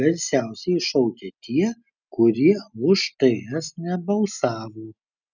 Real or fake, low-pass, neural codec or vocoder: real; 7.2 kHz; none